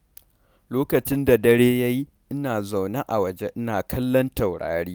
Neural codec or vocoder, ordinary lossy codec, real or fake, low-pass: none; none; real; none